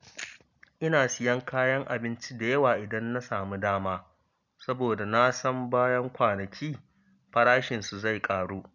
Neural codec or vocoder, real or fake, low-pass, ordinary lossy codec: none; real; 7.2 kHz; none